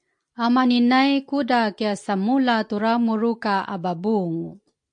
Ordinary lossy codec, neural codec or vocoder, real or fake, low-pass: AAC, 64 kbps; none; real; 9.9 kHz